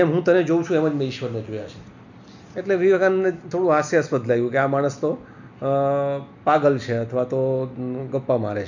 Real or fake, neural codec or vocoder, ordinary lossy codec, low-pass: real; none; none; 7.2 kHz